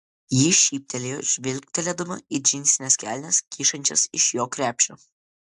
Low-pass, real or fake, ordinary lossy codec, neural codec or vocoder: 10.8 kHz; real; AAC, 96 kbps; none